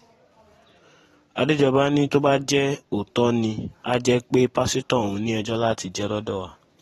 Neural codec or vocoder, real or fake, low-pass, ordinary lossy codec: vocoder, 44.1 kHz, 128 mel bands every 512 samples, BigVGAN v2; fake; 19.8 kHz; AAC, 32 kbps